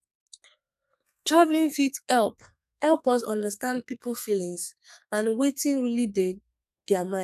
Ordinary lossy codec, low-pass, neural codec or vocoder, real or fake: none; 14.4 kHz; codec, 32 kHz, 1.9 kbps, SNAC; fake